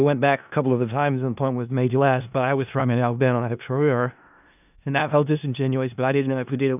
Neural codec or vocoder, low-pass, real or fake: codec, 16 kHz in and 24 kHz out, 0.4 kbps, LongCat-Audio-Codec, four codebook decoder; 3.6 kHz; fake